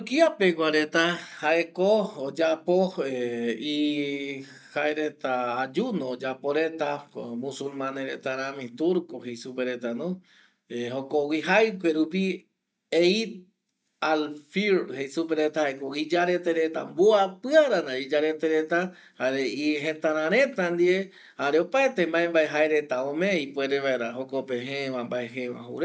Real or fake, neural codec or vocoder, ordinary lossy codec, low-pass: real; none; none; none